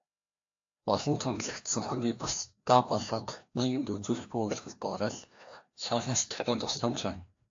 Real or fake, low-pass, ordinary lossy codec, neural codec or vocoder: fake; 7.2 kHz; AAC, 48 kbps; codec, 16 kHz, 1 kbps, FreqCodec, larger model